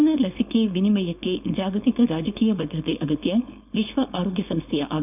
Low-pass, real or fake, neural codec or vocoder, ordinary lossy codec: 3.6 kHz; fake; codec, 16 kHz, 4.8 kbps, FACodec; none